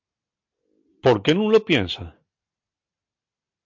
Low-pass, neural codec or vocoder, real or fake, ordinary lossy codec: 7.2 kHz; none; real; MP3, 48 kbps